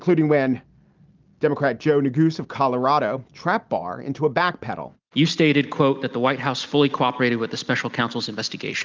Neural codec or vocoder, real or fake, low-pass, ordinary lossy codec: none; real; 7.2 kHz; Opus, 32 kbps